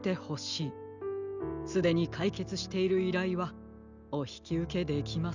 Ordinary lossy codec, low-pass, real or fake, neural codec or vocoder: none; 7.2 kHz; real; none